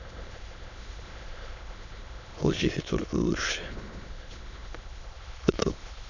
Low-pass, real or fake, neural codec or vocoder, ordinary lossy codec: 7.2 kHz; fake; autoencoder, 22.05 kHz, a latent of 192 numbers a frame, VITS, trained on many speakers; none